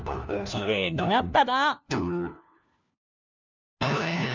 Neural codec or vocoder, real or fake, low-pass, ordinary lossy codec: codec, 16 kHz, 1 kbps, FunCodec, trained on LibriTTS, 50 frames a second; fake; 7.2 kHz; none